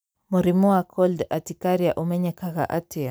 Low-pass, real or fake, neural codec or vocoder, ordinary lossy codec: none; real; none; none